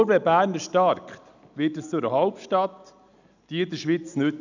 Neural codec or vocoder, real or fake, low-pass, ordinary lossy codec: none; real; 7.2 kHz; none